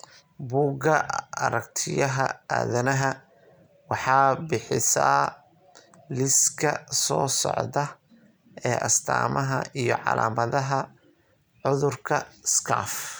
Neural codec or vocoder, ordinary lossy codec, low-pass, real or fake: none; none; none; real